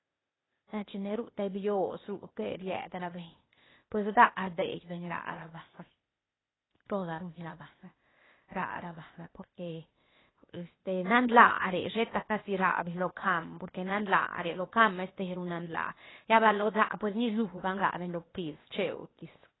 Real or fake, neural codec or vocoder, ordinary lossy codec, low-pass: fake; codec, 16 kHz, 0.8 kbps, ZipCodec; AAC, 16 kbps; 7.2 kHz